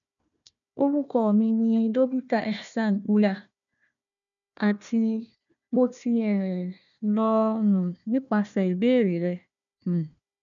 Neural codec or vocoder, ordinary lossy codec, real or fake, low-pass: codec, 16 kHz, 1 kbps, FunCodec, trained on Chinese and English, 50 frames a second; none; fake; 7.2 kHz